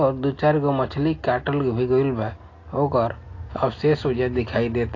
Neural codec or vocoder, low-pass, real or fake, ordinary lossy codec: none; 7.2 kHz; real; AAC, 32 kbps